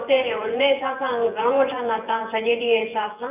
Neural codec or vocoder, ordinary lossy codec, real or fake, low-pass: vocoder, 24 kHz, 100 mel bands, Vocos; none; fake; 3.6 kHz